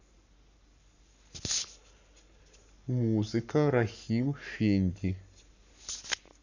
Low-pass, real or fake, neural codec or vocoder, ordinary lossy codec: 7.2 kHz; fake; codec, 44.1 kHz, 7.8 kbps, Pupu-Codec; none